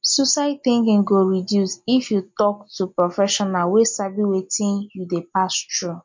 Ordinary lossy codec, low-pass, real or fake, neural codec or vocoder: MP3, 48 kbps; 7.2 kHz; real; none